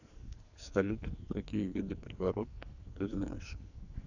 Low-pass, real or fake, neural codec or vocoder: 7.2 kHz; fake; codec, 44.1 kHz, 2.6 kbps, SNAC